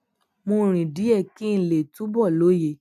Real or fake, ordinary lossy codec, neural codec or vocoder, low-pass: real; none; none; 14.4 kHz